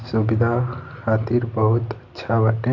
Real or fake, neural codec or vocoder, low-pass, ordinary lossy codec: real; none; 7.2 kHz; none